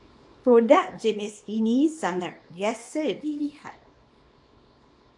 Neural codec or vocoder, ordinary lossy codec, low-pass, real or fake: codec, 24 kHz, 0.9 kbps, WavTokenizer, small release; AAC, 64 kbps; 10.8 kHz; fake